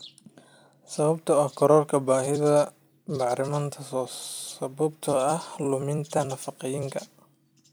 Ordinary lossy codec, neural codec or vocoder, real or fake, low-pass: none; vocoder, 44.1 kHz, 128 mel bands every 256 samples, BigVGAN v2; fake; none